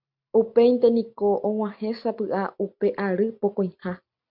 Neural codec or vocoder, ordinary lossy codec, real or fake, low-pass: none; MP3, 48 kbps; real; 5.4 kHz